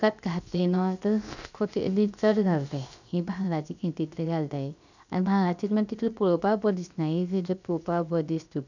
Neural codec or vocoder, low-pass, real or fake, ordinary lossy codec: codec, 16 kHz, about 1 kbps, DyCAST, with the encoder's durations; 7.2 kHz; fake; none